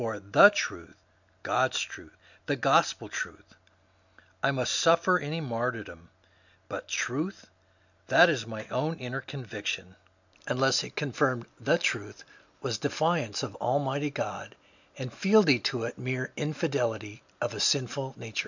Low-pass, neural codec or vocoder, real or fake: 7.2 kHz; none; real